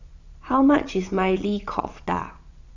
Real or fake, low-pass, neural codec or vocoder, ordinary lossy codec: fake; 7.2 kHz; vocoder, 22.05 kHz, 80 mel bands, Vocos; none